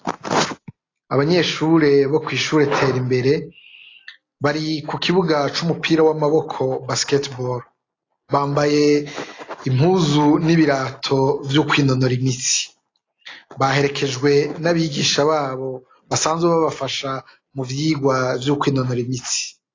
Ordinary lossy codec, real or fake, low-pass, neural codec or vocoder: AAC, 32 kbps; real; 7.2 kHz; none